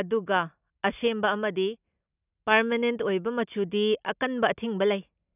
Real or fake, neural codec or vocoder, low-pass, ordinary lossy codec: real; none; 3.6 kHz; none